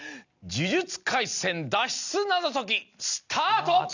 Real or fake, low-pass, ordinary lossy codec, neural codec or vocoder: real; 7.2 kHz; none; none